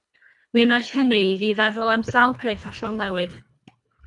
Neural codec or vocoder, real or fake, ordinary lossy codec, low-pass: codec, 24 kHz, 1.5 kbps, HILCodec; fake; MP3, 96 kbps; 10.8 kHz